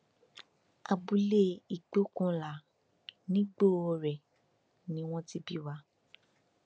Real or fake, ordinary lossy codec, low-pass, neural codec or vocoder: real; none; none; none